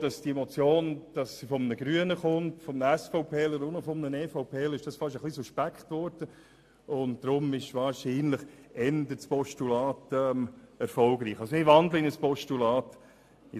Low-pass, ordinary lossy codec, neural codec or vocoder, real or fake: 14.4 kHz; AAC, 64 kbps; none; real